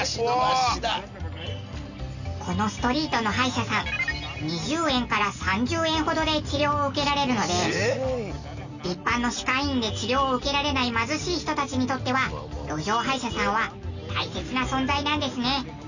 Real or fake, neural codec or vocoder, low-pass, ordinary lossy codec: real; none; 7.2 kHz; none